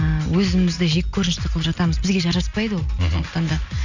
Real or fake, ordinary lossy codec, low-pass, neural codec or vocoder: real; none; 7.2 kHz; none